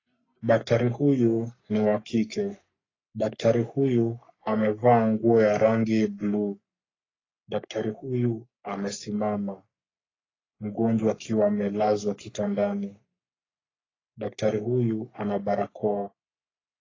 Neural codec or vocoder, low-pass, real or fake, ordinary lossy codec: codec, 44.1 kHz, 3.4 kbps, Pupu-Codec; 7.2 kHz; fake; AAC, 32 kbps